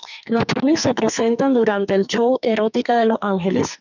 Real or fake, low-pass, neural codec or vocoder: fake; 7.2 kHz; codec, 44.1 kHz, 2.6 kbps, SNAC